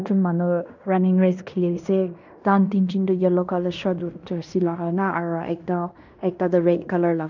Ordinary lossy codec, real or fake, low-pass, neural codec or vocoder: none; fake; 7.2 kHz; codec, 16 kHz in and 24 kHz out, 0.9 kbps, LongCat-Audio-Codec, fine tuned four codebook decoder